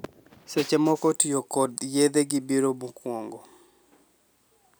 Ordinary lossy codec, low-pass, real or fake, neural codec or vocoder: none; none; real; none